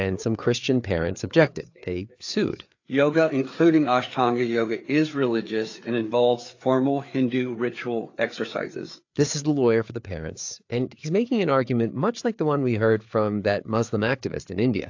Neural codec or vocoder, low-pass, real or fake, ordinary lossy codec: codec, 16 kHz, 4 kbps, FreqCodec, larger model; 7.2 kHz; fake; AAC, 48 kbps